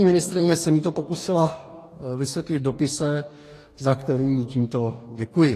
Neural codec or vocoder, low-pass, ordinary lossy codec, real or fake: codec, 44.1 kHz, 2.6 kbps, DAC; 14.4 kHz; AAC, 48 kbps; fake